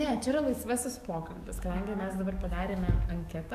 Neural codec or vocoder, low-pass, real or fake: codec, 44.1 kHz, 7.8 kbps, DAC; 14.4 kHz; fake